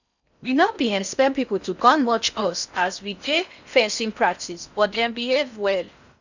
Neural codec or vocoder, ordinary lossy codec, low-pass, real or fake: codec, 16 kHz in and 24 kHz out, 0.6 kbps, FocalCodec, streaming, 4096 codes; none; 7.2 kHz; fake